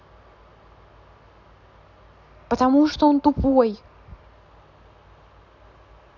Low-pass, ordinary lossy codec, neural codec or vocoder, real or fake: 7.2 kHz; none; none; real